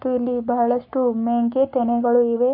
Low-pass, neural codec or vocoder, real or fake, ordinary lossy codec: 5.4 kHz; codec, 44.1 kHz, 7.8 kbps, Pupu-Codec; fake; MP3, 32 kbps